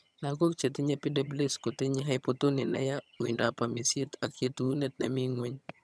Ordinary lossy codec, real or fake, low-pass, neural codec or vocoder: none; fake; none; vocoder, 22.05 kHz, 80 mel bands, HiFi-GAN